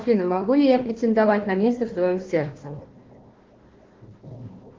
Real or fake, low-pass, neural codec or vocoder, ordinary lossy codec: fake; 7.2 kHz; codec, 16 kHz, 1 kbps, FunCodec, trained on Chinese and English, 50 frames a second; Opus, 16 kbps